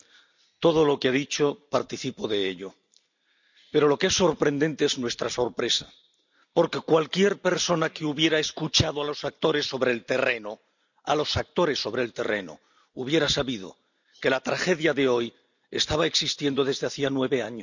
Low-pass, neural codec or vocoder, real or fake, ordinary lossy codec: 7.2 kHz; none; real; none